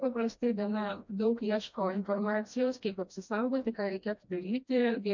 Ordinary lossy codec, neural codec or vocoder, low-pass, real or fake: MP3, 48 kbps; codec, 16 kHz, 1 kbps, FreqCodec, smaller model; 7.2 kHz; fake